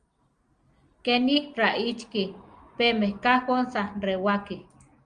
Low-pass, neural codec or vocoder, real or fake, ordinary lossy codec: 9.9 kHz; none; real; Opus, 32 kbps